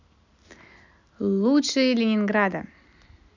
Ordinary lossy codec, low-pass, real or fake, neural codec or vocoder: none; 7.2 kHz; real; none